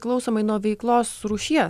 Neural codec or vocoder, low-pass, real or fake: none; 14.4 kHz; real